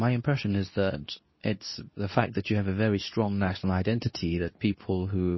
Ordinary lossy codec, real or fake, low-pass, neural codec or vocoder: MP3, 24 kbps; fake; 7.2 kHz; codec, 24 kHz, 0.9 kbps, WavTokenizer, medium speech release version 2